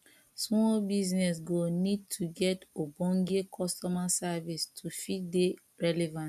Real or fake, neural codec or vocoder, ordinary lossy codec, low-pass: real; none; none; 14.4 kHz